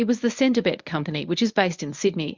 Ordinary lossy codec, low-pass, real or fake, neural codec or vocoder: Opus, 64 kbps; 7.2 kHz; fake; codec, 24 kHz, 0.9 kbps, WavTokenizer, medium speech release version 1